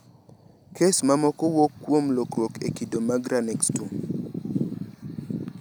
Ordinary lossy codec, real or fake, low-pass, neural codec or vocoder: none; real; none; none